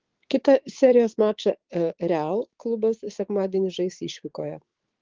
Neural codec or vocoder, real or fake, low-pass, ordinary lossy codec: codec, 16 kHz, 6 kbps, DAC; fake; 7.2 kHz; Opus, 16 kbps